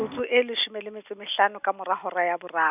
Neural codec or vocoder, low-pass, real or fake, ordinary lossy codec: none; 3.6 kHz; real; none